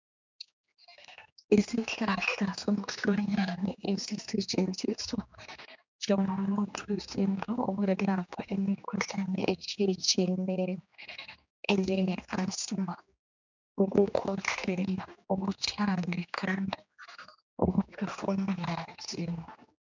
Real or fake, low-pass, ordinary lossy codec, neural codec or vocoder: fake; 7.2 kHz; MP3, 64 kbps; codec, 16 kHz, 2 kbps, X-Codec, HuBERT features, trained on general audio